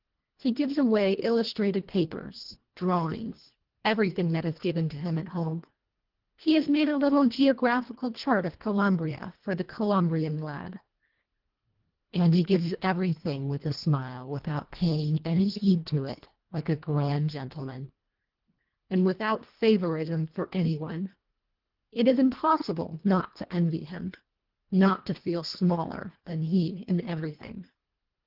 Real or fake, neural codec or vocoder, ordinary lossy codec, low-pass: fake; codec, 24 kHz, 1.5 kbps, HILCodec; Opus, 16 kbps; 5.4 kHz